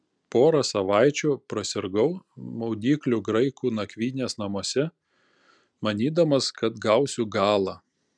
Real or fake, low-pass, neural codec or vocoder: real; 9.9 kHz; none